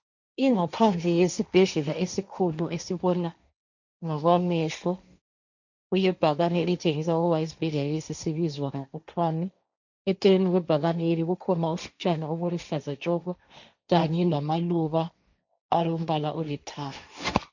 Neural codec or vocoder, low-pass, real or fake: codec, 16 kHz, 1.1 kbps, Voila-Tokenizer; 7.2 kHz; fake